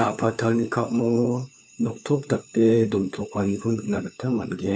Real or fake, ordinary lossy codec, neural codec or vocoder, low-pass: fake; none; codec, 16 kHz, 4 kbps, FunCodec, trained on LibriTTS, 50 frames a second; none